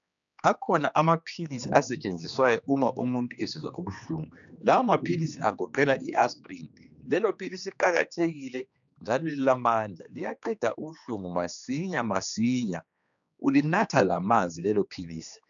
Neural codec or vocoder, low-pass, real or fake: codec, 16 kHz, 2 kbps, X-Codec, HuBERT features, trained on general audio; 7.2 kHz; fake